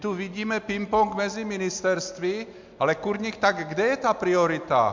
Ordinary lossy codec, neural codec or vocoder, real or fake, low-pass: MP3, 64 kbps; none; real; 7.2 kHz